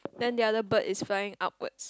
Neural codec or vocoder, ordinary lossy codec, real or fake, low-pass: none; none; real; none